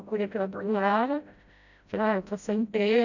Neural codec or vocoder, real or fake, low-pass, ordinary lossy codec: codec, 16 kHz, 0.5 kbps, FreqCodec, smaller model; fake; 7.2 kHz; none